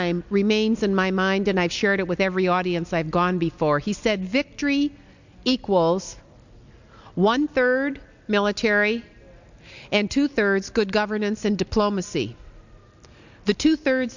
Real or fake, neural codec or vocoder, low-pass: real; none; 7.2 kHz